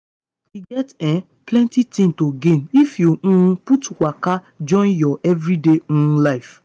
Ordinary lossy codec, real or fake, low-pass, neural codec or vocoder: none; real; 9.9 kHz; none